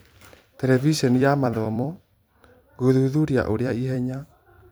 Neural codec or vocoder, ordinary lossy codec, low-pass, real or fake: vocoder, 44.1 kHz, 128 mel bands every 256 samples, BigVGAN v2; none; none; fake